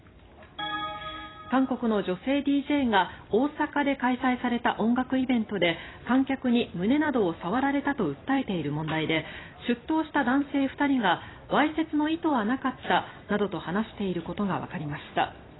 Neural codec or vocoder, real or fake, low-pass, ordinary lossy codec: vocoder, 44.1 kHz, 128 mel bands every 256 samples, BigVGAN v2; fake; 7.2 kHz; AAC, 16 kbps